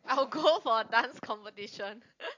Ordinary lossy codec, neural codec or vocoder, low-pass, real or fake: AAC, 48 kbps; none; 7.2 kHz; real